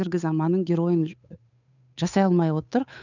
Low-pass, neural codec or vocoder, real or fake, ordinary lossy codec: 7.2 kHz; codec, 16 kHz, 8 kbps, FunCodec, trained on Chinese and English, 25 frames a second; fake; none